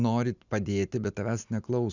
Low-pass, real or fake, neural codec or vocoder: 7.2 kHz; real; none